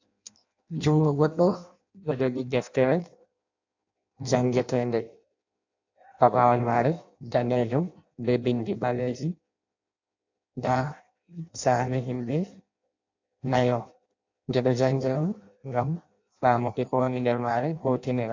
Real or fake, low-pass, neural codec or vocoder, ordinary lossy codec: fake; 7.2 kHz; codec, 16 kHz in and 24 kHz out, 0.6 kbps, FireRedTTS-2 codec; AAC, 48 kbps